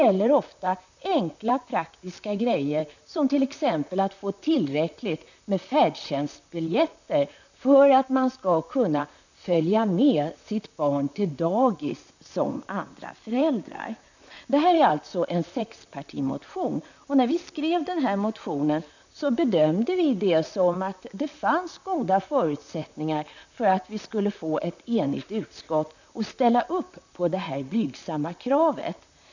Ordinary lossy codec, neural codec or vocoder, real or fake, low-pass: none; vocoder, 44.1 kHz, 128 mel bands, Pupu-Vocoder; fake; 7.2 kHz